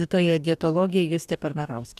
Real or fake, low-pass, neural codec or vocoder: fake; 14.4 kHz; codec, 44.1 kHz, 2.6 kbps, DAC